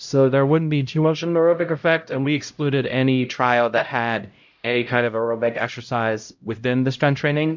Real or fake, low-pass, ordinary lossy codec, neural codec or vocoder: fake; 7.2 kHz; MP3, 64 kbps; codec, 16 kHz, 0.5 kbps, X-Codec, HuBERT features, trained on LibriSpeech